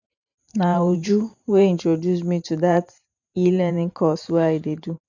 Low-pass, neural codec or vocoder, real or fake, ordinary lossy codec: 7.2 kHz; vocoder, 44.1 kHz, 128 mel bands every 512 samples, BigVGAN v2; fake; none